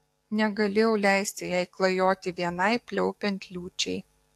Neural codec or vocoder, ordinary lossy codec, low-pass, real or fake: codec, 44.1 kHz, 7.8 kbps, DAC; AAC, 64 kbps; 14.4 kHz; fake